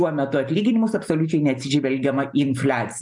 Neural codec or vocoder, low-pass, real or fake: none; 10.8 kHz; real